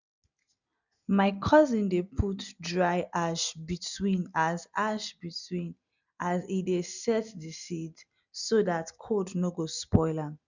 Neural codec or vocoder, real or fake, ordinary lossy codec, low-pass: none; real; none; 7.2 kHz